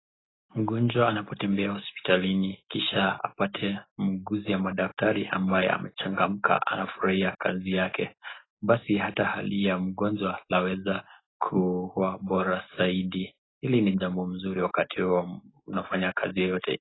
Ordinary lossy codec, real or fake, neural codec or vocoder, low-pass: AAC, 16 kbps; real; none; 7.2 kHz